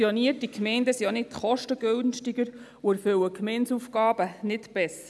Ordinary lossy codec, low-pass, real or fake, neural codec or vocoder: none; none; real; none